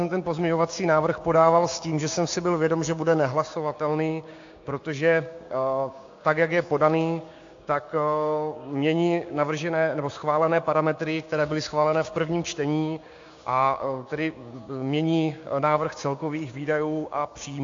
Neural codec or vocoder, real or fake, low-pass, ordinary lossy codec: codec, 16 kHz, 6 kbps, DAC; fake; 7.2 kHz; AAC, 48 kbps